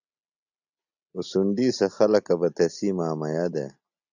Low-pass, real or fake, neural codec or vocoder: 7.2 kHz; real; none